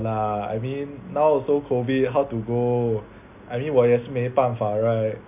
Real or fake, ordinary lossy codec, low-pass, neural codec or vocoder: real; none; 3.6 kHz; none